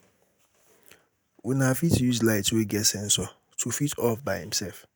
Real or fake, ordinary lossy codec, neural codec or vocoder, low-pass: real; none; none; none